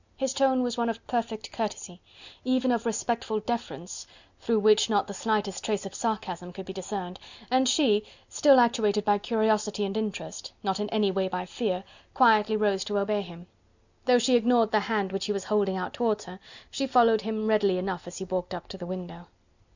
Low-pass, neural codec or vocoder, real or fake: 7.2 kHz; none; real